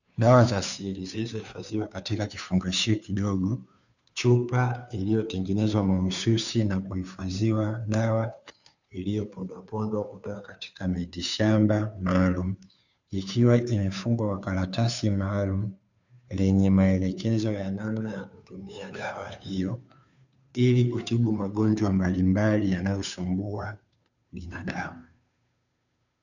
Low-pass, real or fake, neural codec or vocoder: 7.2 kHz; fake; codec, 16 kHz, 2 kbps, FunCodec, trained on Chinese and English, 25 frames a second